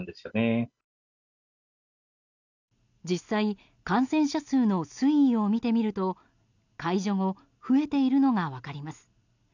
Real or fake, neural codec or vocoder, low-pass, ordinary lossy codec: real; none; 7.2 kHz; none